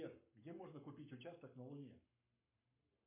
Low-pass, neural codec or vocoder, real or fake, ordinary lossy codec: 3.6 kHz; codec, 44.1 kHz, 7.8 kbps, Pupu-Codec; fake; MP3, 32 kbps